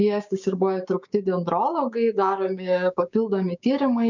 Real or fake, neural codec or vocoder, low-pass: fake; codec, 16 kHz, 6 kbps, DAC; 7.2 kHz